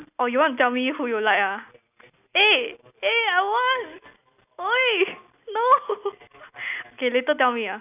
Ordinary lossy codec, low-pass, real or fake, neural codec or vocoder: none; 3.6 kHz; real; none